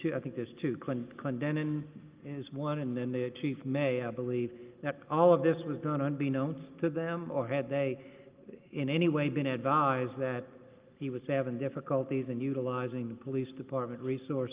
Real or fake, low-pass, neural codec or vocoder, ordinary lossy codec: real; 3.6 kHz; none; Opus, 32 kbps